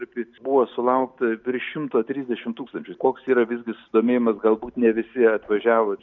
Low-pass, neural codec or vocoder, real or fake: 7.2 kHz; none; real